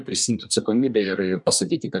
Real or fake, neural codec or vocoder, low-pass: fake; codec, 24 kHz, 1 kbps, SNAC; 10.8 kHz